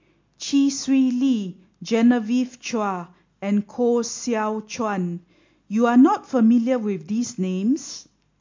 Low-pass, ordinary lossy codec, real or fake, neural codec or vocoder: 7.2 kHz; MP3, 48 kbps; real; none